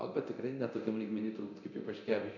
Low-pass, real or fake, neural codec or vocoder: 7.2 kHz; fake; codec, 24 kHz, 0.9 kbps, DualCodec